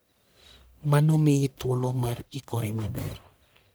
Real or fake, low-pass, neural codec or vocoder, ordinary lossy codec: fake; none; codec, 44.1 kHz, 1.7 kbps, Pupu-Codec; none